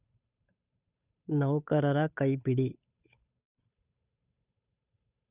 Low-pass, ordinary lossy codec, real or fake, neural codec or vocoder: 3.6 kHz; none; fake; codec, 16 kHz, 8 kbps, FunCodec, trained on Chinese and English, 25 frames a second